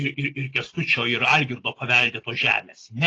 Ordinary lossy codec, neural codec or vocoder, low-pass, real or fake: AAC, 32 kbps; none; 9.9 kHz; real